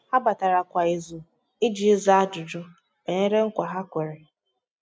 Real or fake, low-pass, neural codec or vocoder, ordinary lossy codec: real; none; none; none